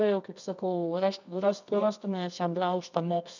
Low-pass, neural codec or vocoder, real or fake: 7.2 kHz; codec, 24 kHz, 0.9 kbps, WavTokenizer, medium music audio release; fake